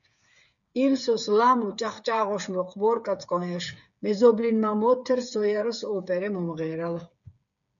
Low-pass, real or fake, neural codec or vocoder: 7.2 kHz; fake; codec, 16 kHz, 8 kbps, FreqCodec, smaller model